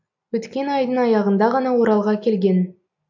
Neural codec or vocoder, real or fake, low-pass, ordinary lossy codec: none; real; 7.2 kHz; AAC, 48 kbps